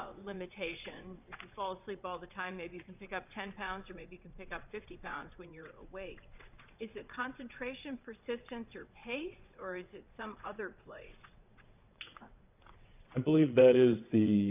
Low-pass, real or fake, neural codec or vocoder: 3.6 kHz; fake; vocoder, 22.05 kHz, 80 mel bands, WaveNeXt